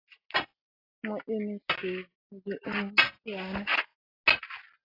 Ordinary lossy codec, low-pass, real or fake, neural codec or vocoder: AAC, 24 kbps; 5.4 kHz; real; none